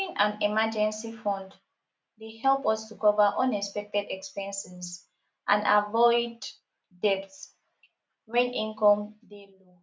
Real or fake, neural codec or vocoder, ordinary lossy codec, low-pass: real; none; none; none